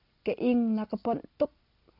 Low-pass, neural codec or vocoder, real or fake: 5.4 kHz; none; real